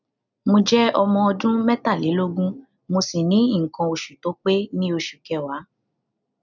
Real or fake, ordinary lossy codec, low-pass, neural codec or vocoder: real; none; 7.2 kHz; none